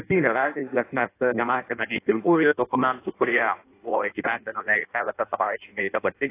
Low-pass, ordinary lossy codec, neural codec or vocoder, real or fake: 3.6 kHz; AAC, 24 kbps; codec, 16 kHz in and 24 kHz out, 0.6 kbps, FireRedTTS-2 codec; fake